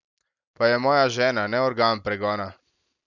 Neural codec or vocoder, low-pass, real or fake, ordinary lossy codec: none; 7.2 kHz; real; none